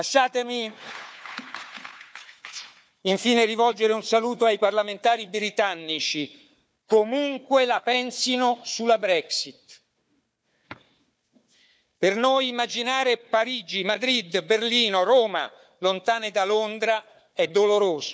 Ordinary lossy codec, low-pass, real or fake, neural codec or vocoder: none; none; fake; codec, 16 kHz, 4 kbps, FunCodec, trained on Chinese and English, 50 frames a second